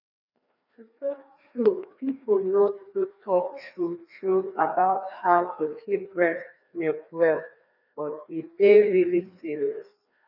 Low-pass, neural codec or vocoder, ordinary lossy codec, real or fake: 5.4 kHz; codec, 16 kHz, 2 kbps, FreqCodec, larger model; none; fake